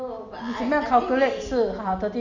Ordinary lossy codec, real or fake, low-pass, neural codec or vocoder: Opus, 64 kbps; real; 7.2 kHz; none